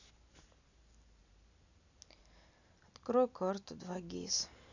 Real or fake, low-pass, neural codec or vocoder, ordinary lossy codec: real; 7.2 kHz; none; none